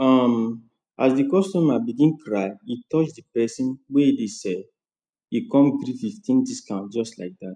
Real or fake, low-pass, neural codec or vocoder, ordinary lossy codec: real; 9.9 kHz; none; none